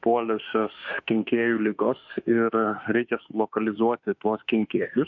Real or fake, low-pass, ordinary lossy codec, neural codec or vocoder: fake; 7.2 kHz; MP3, 48 kbps; autoencoder, 48 kHz, 32 numbers a frame, DAC-VAE, trained on Japanese speech